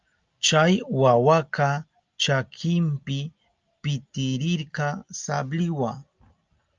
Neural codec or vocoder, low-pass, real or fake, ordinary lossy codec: none; 7.2 kHz; real; Opus, 24 kbps